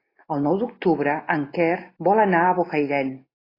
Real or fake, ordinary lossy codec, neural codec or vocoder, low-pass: real; AAC, 24 kbps; none; 5.4 kHz